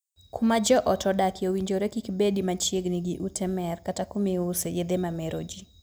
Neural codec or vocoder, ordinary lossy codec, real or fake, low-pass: none; none; real; none